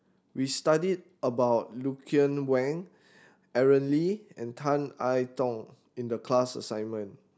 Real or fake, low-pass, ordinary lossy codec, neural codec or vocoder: real; none; none; none